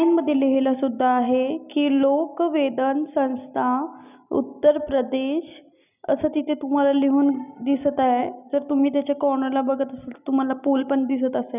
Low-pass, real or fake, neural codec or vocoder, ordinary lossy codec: 3.6 kHz; real; none; none